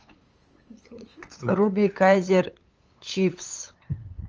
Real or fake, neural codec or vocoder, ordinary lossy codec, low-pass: fake; codec, 16 kHz, 8 kbps, FunCodec, trained on LibriTTS, 25 frames a second; Opus, 24 kbps; 7.2 kHz